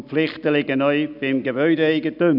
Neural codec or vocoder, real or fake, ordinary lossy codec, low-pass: none; real; none; 5.4 kHz